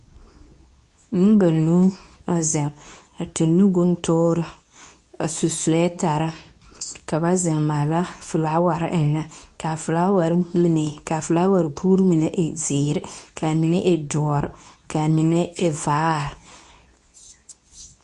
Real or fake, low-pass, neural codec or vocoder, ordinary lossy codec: fake; 10.8 kHz; codec, 24 kHz, 0.9 kbps, WavTokenizer, medium speech release version 2; Opus, 64 kbps